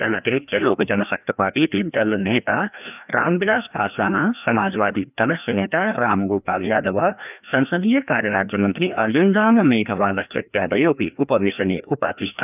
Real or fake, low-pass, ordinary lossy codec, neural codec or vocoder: fake; 3.6 kHz; none; codec, 16 kHz, 1 kbps, FreqCodec, larger model